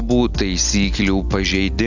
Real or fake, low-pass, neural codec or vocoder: real; 7.2 kHz; none